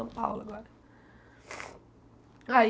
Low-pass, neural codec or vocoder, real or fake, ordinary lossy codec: none; none; real; none